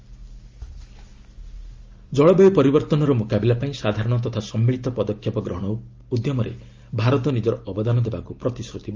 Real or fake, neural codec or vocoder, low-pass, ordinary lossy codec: real; none; 7.2 kHz; Opus, 32 kbps